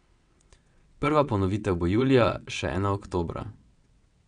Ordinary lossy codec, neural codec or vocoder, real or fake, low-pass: none; vocoder, 22.05 kHz, 80 mel bands, WaveNeXt; fake; 9.9 kHz